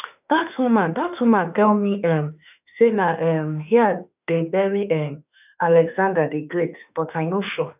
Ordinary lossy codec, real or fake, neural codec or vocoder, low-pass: none; fake; autoencoder, 48 kHz, 32 numbers a frame, DAC-VAE, trained on Japanese speech; 3.6 kHz